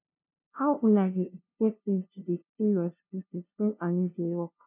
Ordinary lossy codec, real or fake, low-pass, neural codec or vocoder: none; fake; 3.6 kHz; codec, 16 kHz, 0.5 kbps, FunCodec, trained on LibriTTS, 25 frames a second